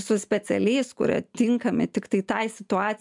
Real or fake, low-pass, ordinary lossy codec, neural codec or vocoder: real; 10.8 kHz; MP3, 96 kbps; none